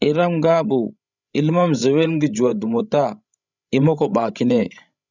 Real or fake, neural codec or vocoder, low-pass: fake; codec, 16 kHz, 16 kbps, FreqCodec, larger model; 7.2 kHz